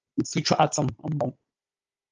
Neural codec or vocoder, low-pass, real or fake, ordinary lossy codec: codec, 16 kHz, 16 kbps, FunCodec, trained on Chinese and English, 50 frames a second; 7.2 kHz; fake; Opus, 24 kbps